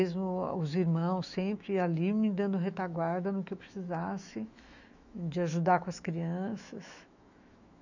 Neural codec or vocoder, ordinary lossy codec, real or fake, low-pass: autoencoder, 48 kHz, 128 numbers a frame, DAC-VAE, trained on Japanese speech; none; fake; 7.2 kHz